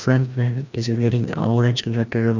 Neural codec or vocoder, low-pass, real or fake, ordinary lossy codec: codec, 16 kHz, 1 kbps, FreqCodec, larger model; 7.2 kHz; fake; none